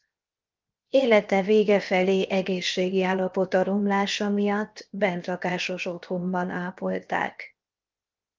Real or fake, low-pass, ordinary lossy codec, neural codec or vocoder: fake; 7.2 kHz; Opus, 32 kbps; codec, 16 kHz, 0.7 kbps, FocalCodec